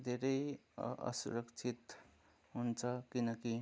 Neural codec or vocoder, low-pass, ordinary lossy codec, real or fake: none; none; none; real